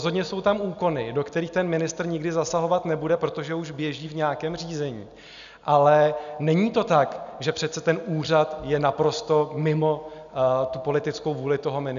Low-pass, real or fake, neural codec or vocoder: 7.2 kHz; real; none